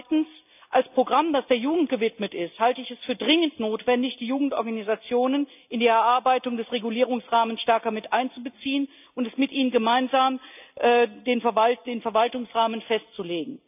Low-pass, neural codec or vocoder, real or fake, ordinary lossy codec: 3.6 kHz; none; real; none